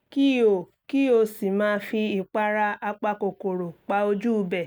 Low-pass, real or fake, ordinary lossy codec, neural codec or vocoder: none; real; none; none